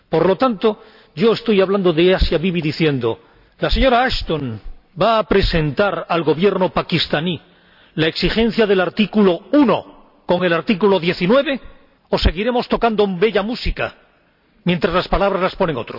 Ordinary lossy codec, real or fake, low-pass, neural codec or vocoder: none; real; 5.4 kHz; none